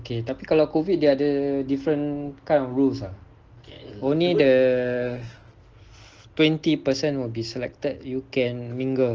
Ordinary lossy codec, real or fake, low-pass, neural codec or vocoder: Opus, 16 kbps; real; 7.2 kHz; none